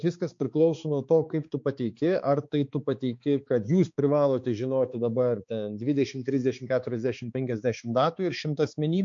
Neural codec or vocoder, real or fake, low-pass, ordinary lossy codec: codec, 16 kHz, 4 kbps, X-Codec, HuBERT features, trained on balanced general audio; fake; 7.2 kHz; MP3, 48 kbps